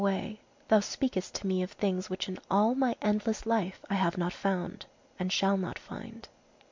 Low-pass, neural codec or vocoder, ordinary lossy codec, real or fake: 7.2 kHz; none; MP3, 64 kbps; real